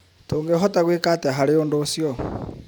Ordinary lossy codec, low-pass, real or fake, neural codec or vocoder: none; none; fake; vocoder, 44.1 kHz, 128 mel bands every 512 samples, BigVGAN v2